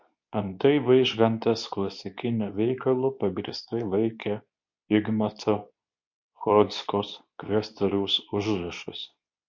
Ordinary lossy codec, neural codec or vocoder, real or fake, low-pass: MP3, 48 kbps; codec, 24 kHz, 0.9 kbps, WavTokenizer, medium speech release version 2; fake; 7.2 kHz